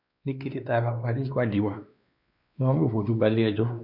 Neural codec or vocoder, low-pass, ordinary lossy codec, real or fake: codec, 16 kHz, 2 kbps, X-Codec, WavLM features, trained on Multilingual LibriSpeech; 5.4 kHz; none; fake